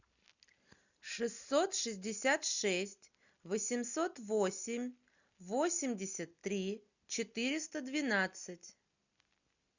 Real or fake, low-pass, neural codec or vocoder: real; 7.2 kHz; none